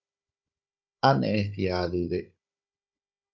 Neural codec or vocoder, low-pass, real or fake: codec, 16 kHz, 16 kbps, FunCodec, trained on Chinese and English, 50 frames a second; 7.2 kHz; fake